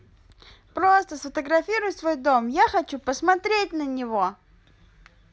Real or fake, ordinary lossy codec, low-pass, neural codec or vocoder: real; none; none; none